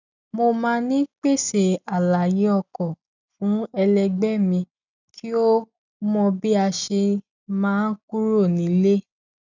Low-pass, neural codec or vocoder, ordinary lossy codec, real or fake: 7.2 kHz; none; none; real